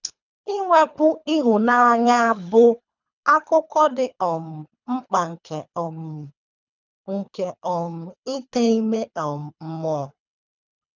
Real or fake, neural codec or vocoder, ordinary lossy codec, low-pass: fake; codec, 24 kHz, 3 kbps, HILCodec; none; 7.2 kHz